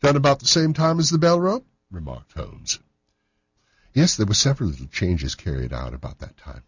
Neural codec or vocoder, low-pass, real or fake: none; 7.2 kHz; real